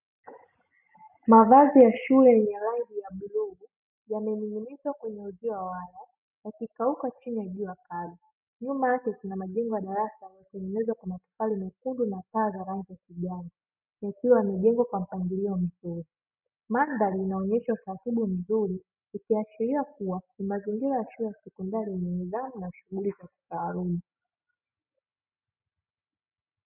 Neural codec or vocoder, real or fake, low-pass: none; real; 3.6 kHz